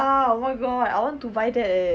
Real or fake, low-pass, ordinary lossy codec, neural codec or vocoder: real; none; none; none